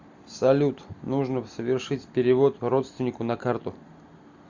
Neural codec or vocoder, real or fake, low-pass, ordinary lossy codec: none; real; 7.2 kHz; Opus, 64 kbps